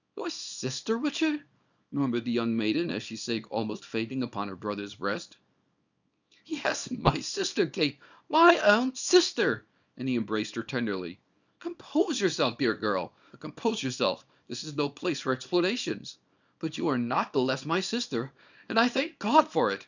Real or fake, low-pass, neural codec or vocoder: fake; 7.2 kHz; codec, 24 kHz, 0.9 kbps, WavTokenizer, small release